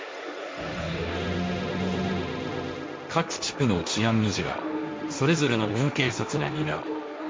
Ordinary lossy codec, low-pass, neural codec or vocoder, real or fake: none; none; codec, 16 kHz, 1.1 kbps, Voila-Tokenizer; fake